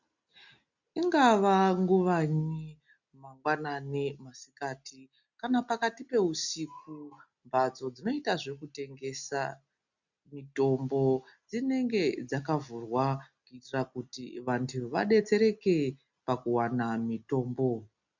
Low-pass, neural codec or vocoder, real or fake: 7.2 kHz; none; real